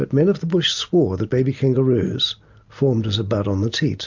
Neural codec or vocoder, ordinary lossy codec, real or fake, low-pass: none; MP3, 64 kbps; real; 7.2 kHz